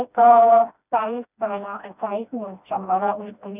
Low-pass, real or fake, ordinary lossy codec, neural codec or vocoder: 3.6 kHz; fake; none; codec, 16 kHz, 1 kbps, FreqCodec, smaller model